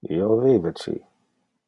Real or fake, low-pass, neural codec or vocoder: real; 10.8 kHz; none